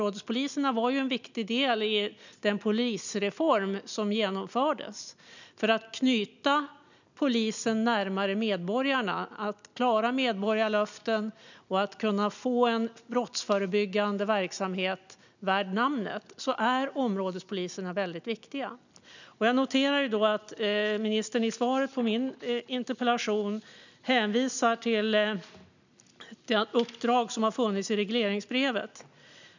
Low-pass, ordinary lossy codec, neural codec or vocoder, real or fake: 7.2 kHz; none; none; real